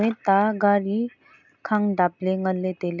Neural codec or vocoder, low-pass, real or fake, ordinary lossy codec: none; 7.2 kHz; real; none